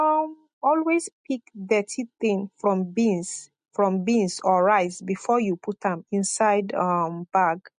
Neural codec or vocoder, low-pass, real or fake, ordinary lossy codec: none; 9.9 kHz; real; MP3, 48 kbps